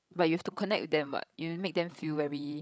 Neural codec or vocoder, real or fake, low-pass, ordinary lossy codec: codec, 16 kHz, 16 kbps, FreqCodec, larger model; fake; none; none